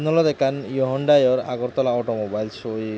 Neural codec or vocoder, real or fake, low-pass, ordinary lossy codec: none; real; none; none